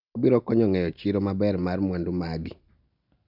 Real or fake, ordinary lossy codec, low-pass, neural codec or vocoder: real; none; 5.4 kHz; none